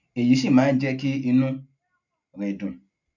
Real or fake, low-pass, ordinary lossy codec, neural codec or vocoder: real; 7.2 kHz; none; none